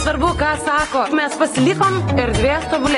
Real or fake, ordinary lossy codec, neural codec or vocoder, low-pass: real; AAC, 32 kbps; none; 10.8 kHz